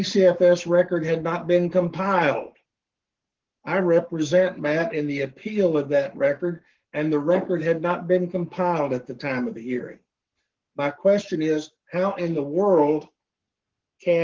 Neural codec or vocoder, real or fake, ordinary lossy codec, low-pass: codec, 44.1 kHz, 7.8 kbps, Pupu-Codec; fake; Opus, 16 kbps; 7.2 kHz